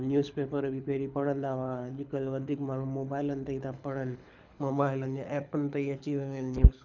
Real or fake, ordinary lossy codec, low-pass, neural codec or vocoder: fake; none; 7.2 kHz; codec, 24 kHz, 6 kbps, HILCodec